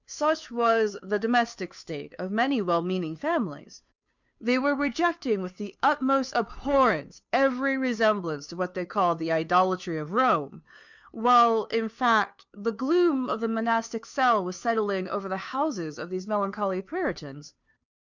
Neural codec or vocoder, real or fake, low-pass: codec, 16 kHz, 2 kbps, FunCodec, trained on Chinese and English, 25 frames a second; fake; 7.2 kHz